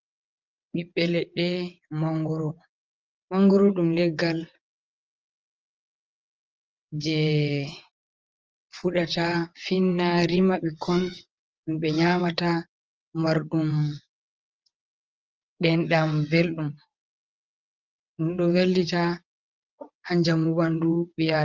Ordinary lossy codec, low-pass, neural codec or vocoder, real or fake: Opus, 24 kbps; 7.2 kHz; vocoder, 22.05 kHz, 80 mel bands, WaveNeXt; fake